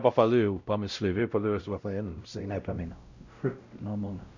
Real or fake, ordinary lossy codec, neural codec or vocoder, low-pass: fake; none; codec, 16 kHz, 0.5 kbps, X-Codec, WavLM features, trained on Multilingual LibriSpeech; 7.2 kHz